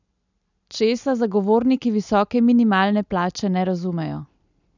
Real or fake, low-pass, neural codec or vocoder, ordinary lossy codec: real; 7.2 kHz; none; none